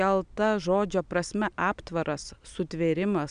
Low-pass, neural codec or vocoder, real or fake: 9.9 kHz; none; real